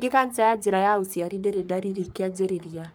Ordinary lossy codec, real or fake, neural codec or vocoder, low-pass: none; fake; codec, 44.1 kHz, 3.4 kbps, Pupu-Codec; none